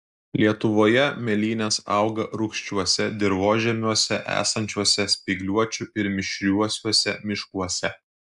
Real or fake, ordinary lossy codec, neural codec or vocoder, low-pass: real; MP3, 96 kbps; none; 10.8 kHz